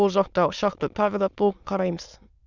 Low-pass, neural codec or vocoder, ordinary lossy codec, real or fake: 7.2 kHz; autoencoder, 22.05 kHz, a latent of 192 numbers a frame, VITS, trained on many speakers; none; fake